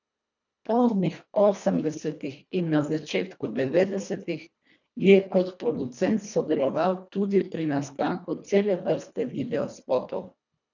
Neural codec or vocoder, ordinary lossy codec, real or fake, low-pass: codec, 24 kHz, 1.5 kbps, HILCodec; none; fake; 7.2 kHz